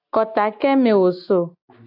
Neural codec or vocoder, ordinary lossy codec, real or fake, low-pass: none; AAC, 48 kbps; real; 5.4 kHz